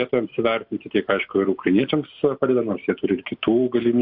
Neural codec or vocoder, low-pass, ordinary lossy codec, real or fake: none; 5.4 kHz; AAC, 48 kbps; real